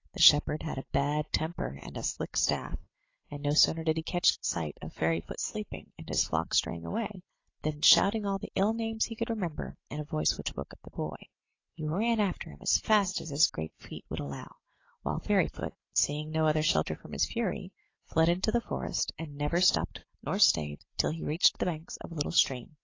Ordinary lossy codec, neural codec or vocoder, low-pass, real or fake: AAC, 32 kbps; none; 7.2 kHz; real